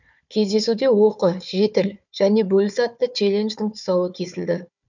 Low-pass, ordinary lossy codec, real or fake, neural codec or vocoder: 7.2 kHz; none; fake; codec, 16 kHz, 4 kbps, FunCodec, trained on Chinese and English, 50 frames a second